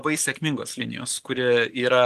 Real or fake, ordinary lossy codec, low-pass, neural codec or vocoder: real; Opus, 32 kbps; 14.4 kHz; none